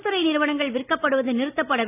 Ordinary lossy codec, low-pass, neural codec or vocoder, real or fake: none; 3.6 kHz; none; real